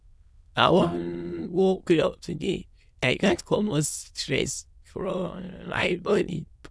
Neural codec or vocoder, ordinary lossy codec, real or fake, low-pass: autoencoder, 22.05 kHz, a latent of 192 numbers a frame, VITS, trained on many speakers; none; fake; none